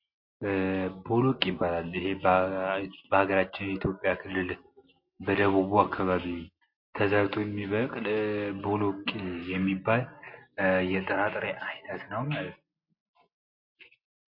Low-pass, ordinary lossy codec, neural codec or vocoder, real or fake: 5.4 kHz; MP3, 32 kbps; none; real